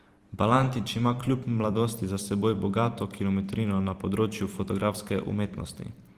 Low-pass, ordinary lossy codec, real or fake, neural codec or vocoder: 14.4 kHz; Opus, 24 kbps; fake; vocoder, 44.1 kHz, 128 mel bands every 512 samples, BigVGAN v2